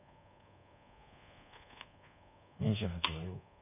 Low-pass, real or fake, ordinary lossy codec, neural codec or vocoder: 3.6 kHz; fake; none; codec, 24 kHz, 1.2 kbps, DualCodec